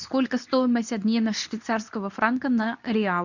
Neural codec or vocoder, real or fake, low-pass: codec, 24 kHz, 0.9 kbps, WavTokenizer, medium speech release version 2; fake; 7.2 kHz